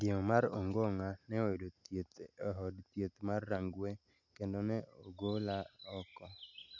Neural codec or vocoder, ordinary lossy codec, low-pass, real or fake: none; none; 7.2 kHz; real